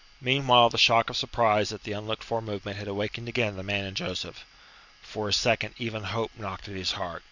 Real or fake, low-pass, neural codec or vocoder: real; 7.2 kHz; none